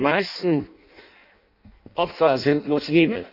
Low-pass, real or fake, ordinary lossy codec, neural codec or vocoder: 5.4 kHz; fake; none; codec, 16 kHz in and 24 kHz out, 0.6 kbps, FireRedTTS-2 codec